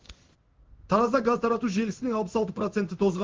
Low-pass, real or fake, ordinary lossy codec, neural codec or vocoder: 7.2 kHz; fake; Opus, 16 kbps; codec, 16 kHz in and 24 kHz out, 1 kbps, XY-Tokenizer